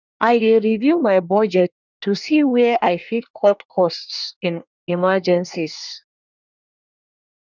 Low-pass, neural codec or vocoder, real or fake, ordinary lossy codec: 7.2 kHz; codec, 24 kHz, 1 kbps, SNAC; fake; none